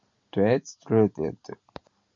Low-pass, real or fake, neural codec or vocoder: 7.2 kHz; real; none